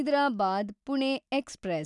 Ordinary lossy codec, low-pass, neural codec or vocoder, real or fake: none; 10.8 kHz; none; real